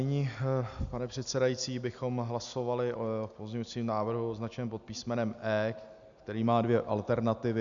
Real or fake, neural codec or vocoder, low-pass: real; none; 7.2 kHz